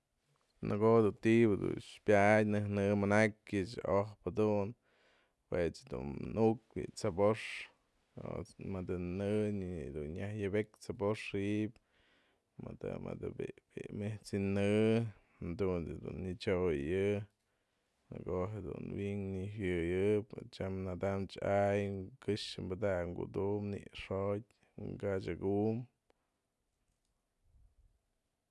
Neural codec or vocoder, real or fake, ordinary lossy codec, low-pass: none; real; none; none